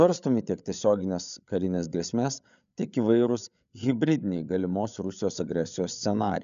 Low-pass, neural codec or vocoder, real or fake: 7.2 kHz; codec, 16 kHz, 8 kbps, FreqCodec, larger model; fake